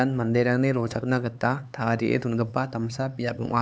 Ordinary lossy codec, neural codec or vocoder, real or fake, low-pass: none; codec, 16 kHz, 4 kbps, X-Codec, HuBERT features, trained on LibriSpeech; fake; none